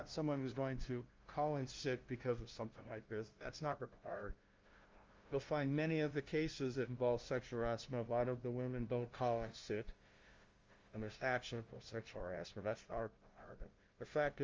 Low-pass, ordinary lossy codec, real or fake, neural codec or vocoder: 7.2 kHz; Opus, 32 kbps; fake; codec, 16 kHz, 0.5 kbps, FunCodec, trained on LibriTTS, 25 frames a second